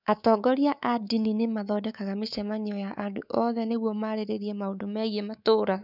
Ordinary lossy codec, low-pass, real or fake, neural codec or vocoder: none; 5.4 kHz; fake; codec, 24 kHz, 3.1 kbps, DualCodec